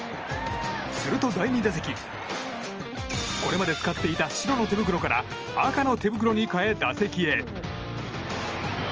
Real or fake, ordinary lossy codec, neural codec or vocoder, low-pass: real; Opus, 24 kbps; none; 7.2 kHz